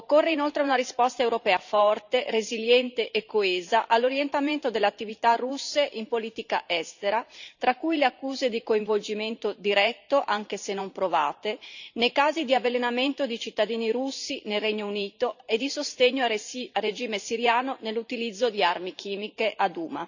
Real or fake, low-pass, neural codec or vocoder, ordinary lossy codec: fake; 7.2 kHz; vocoder, 44.1 kHz, 128 mel bands every 512 samples, BigVGAN v2; none